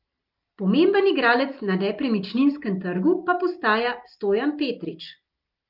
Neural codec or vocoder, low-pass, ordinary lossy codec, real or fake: none; 5.4 kHz; Opus, 32 kbps; real